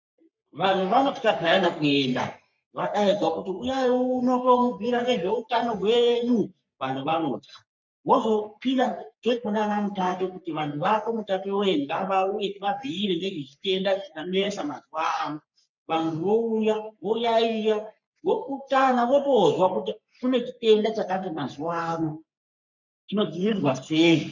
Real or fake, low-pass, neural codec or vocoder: fake; 7.2 kHz; codec, 44.1 kHz, 3.4 kbps, Pupu-Codec